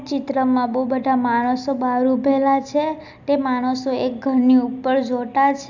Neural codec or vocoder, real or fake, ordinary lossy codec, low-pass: none; real; none; 7.2 kHz